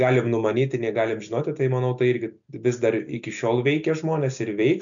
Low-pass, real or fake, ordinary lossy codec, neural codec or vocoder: 7.2 kHz; real; MP3, 96 kbps; none